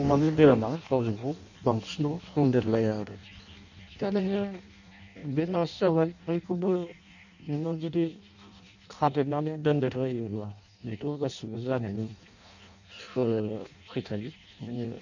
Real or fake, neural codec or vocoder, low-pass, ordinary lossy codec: fake; codec, 16 kHz in and 24 kHz out, 0.6 kbps, FireRedTTS-2 codec; 7.2 kHz; none